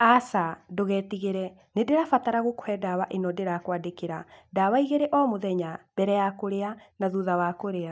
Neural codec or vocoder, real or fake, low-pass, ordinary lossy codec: none; real; none; none